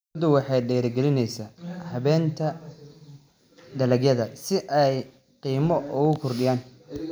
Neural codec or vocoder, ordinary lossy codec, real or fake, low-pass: none; none; real; none